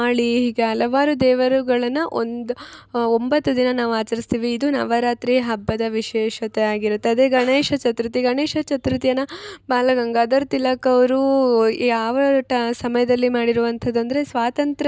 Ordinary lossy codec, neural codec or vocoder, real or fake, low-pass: none; none; real; none